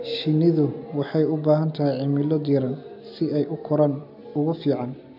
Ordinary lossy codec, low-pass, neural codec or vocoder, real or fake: none; 5.4 kHz; none; real